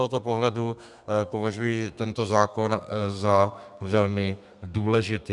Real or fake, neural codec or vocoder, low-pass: fake; codec, 32 kHz, 1.9 kbps, SNAC; 10.8 kHz